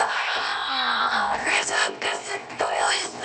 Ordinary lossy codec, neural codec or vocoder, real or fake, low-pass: none; codec, 16 kHz, 0.7 kbps, FocalCodec; fake; none